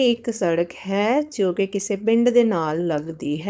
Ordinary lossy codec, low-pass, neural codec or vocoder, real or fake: none; none; codec, 16 kHz, 4.8 kbps, FACodec; fake